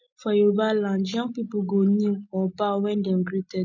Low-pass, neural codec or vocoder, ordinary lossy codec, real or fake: 7.2 kHz; none; MP3, 64 kbps; real